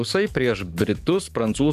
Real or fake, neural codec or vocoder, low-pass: fake; codec, 44.1 kHz, 7.8 kbps, DAC; 14.4 kHz